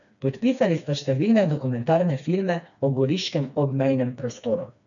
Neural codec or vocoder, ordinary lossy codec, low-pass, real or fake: codec, 16 kHz, 2 kbps, FreqCodec, smaller model; none; 7.2 kHz; fake